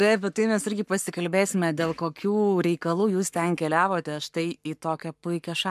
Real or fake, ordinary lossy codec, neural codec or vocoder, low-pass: fake; MP3, 96 kbps; codec, 44.1 kHz, 7.8 kbps, Pupu-Codec; 14.4 kHz